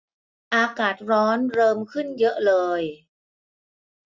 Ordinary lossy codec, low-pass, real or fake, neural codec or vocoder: none; none; real; none